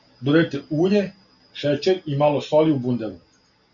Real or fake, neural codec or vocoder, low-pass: real; none; 7.2 kHz